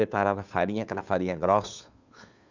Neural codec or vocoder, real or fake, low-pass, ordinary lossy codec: codec, 16 kHz, 2 kbps, FunCodec, trained on Chinese and English, 25 frames a second; fake; 7.2 kHz; none